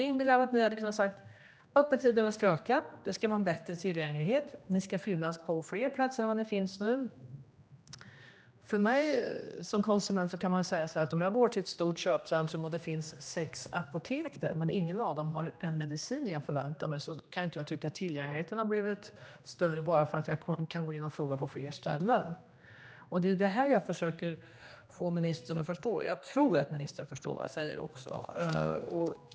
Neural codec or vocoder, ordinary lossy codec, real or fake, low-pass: codec, 16 kHz, 1 kbps, X-Codec, HuBERT features, trained on general audio; none; fake; none